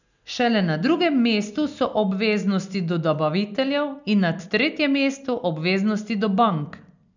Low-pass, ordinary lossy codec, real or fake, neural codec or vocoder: 7.2 kHz; none; real; none